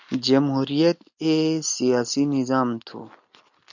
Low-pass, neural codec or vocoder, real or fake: 7.2 kHz; none; real